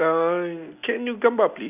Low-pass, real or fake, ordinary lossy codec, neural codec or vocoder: 3.6 kHz; real; none; none